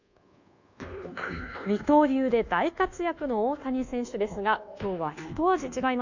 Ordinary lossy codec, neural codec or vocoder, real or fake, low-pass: none; codec, 24 kHz, 1.2 kbps, DualCodec; fake; 7.2 kHz